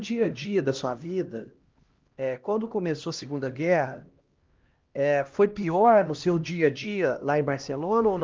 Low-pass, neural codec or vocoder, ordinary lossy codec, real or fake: 7.2 kHz; codec, 16 kHz, 1 kbps, X-Codec, HuBERT features, trained on LibriSpeech; Opus, 32 kbps; fake